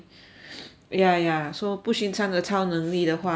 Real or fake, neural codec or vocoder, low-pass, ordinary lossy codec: real; none; none; none